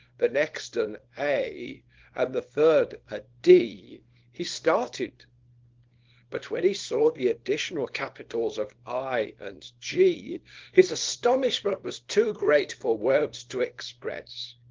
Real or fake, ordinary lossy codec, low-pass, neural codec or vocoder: fake; Opus, 16 kbps; 7.2 kHz; codec, 24 kHz, 0.9 kbps, WavTokenizer, small release